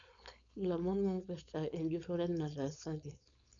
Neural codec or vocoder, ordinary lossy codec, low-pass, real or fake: codec, 16 kHz, 4.8 kbps, FACodec; none; 7.2 kHz; fake